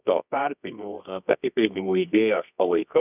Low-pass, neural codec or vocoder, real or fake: 3.6 kHz; codec, 24 kHz, 0.9 kbps, WavTokenizer, medium music audio release; fake